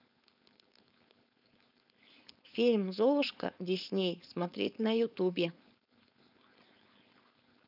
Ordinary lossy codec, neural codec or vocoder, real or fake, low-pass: none; codec, 16 kHz, 4.8 kbps, FACodec; fake; 5.4 kHz